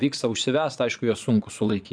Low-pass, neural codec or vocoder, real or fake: 9.9 kHz; vocoder, 22.05 kHz, 80 mel bands, Vocos; fake